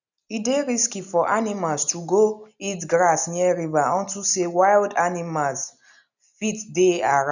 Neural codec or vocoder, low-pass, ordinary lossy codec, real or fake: none; 7.2 kHz; none; real